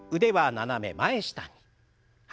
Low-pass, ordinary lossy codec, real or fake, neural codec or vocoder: none; none; real; none